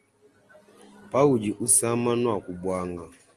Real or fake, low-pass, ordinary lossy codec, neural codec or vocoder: real; 10.8 kHz; Opus, 24 kbps; none